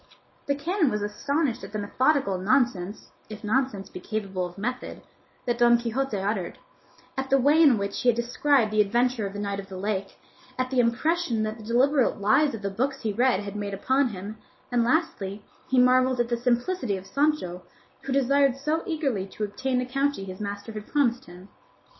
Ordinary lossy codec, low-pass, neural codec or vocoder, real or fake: MP3, 24 kbps; 7.2 kHz; none; real